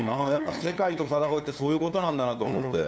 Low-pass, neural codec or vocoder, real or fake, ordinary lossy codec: none; codec, 16 kHz, 2 kbps, FunCodec, trained on LibriTTS, 25 frames a second; fake; none